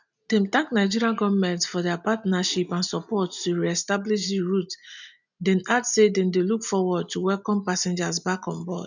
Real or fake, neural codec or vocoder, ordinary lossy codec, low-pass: real; none; none; 7.2 kHz